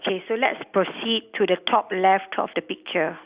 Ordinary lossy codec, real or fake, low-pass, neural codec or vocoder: Opus, 64 kbps; real; 3.6 kHz; none